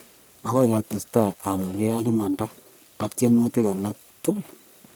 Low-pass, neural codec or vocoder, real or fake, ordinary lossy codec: none; codec, 44.1 kHz, 1.7 kbps, Pupu-Codec; fake; none